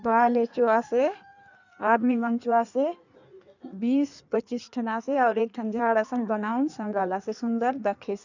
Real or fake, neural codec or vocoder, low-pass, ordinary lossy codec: fake; codec, 16 kHz in and 24 kHz out, 1.1 kbps, FireRedTTS-2 codec; 7.2 kHz; none